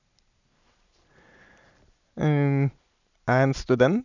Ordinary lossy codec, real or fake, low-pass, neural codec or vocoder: none; real; 7.2 kHz; none